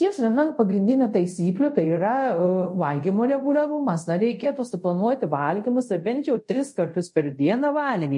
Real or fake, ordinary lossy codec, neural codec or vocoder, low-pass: fake; MP3, 48 kbps; codec, 24 kHz, 0.5 kbps, DualCodec; 10.8 kHz